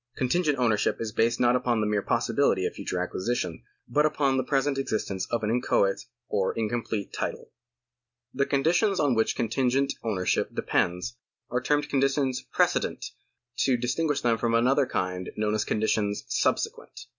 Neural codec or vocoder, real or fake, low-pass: none; real; 7.2 kHz